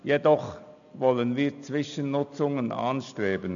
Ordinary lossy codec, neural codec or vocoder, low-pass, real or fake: none; none; 7.2 kHz; real